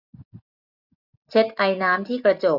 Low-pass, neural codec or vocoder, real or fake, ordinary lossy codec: 5.4 kHz; none; real; none